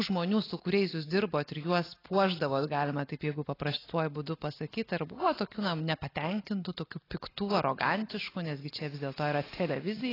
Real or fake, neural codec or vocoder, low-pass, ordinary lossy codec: real; none; 5.4 kHz; AAC, 24 kbps